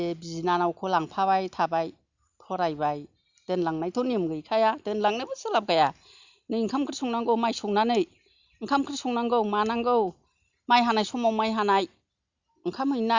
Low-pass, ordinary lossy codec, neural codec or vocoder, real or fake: 7.2 kHz; Opus, 64 kbps; none; real